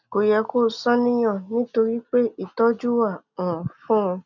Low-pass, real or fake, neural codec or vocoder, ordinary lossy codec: 7.2 kHz; real; none; none